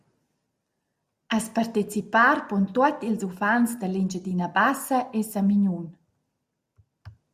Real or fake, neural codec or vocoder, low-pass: real; none; 14.4 kHz